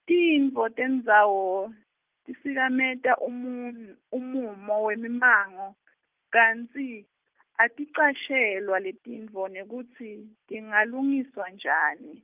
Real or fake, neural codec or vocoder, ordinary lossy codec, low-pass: real; none; Opus, 24 kbps; 3.6 kHz